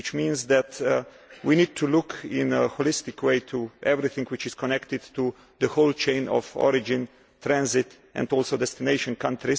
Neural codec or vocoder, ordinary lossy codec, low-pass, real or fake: none; none; none; real